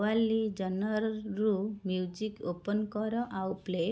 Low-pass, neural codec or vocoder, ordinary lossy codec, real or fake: none; none; none; real